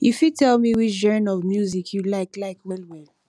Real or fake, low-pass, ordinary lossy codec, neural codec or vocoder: real; none; none; none